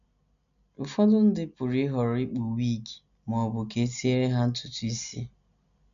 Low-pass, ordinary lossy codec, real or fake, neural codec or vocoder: 7.2 kHz; none; real; none